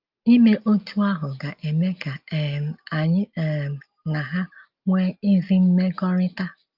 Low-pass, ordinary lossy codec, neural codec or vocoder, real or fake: 5.4 kHz; Opus, 24 kbps; none; real